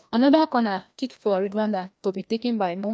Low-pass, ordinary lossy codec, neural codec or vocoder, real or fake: none; none; codec, 16 kHz, 1 kbps, FreqCodec, larger model; fake